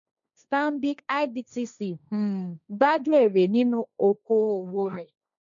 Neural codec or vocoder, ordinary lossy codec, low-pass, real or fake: codec, 16 kHz, 1.1 kbps, Voila-Tokenizer; none; 7.2 kHz; fake